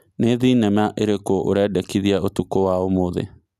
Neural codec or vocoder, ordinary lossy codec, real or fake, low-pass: none; none; real; 14.4 kHz